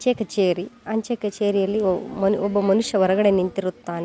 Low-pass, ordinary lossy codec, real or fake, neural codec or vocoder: none; none; real; none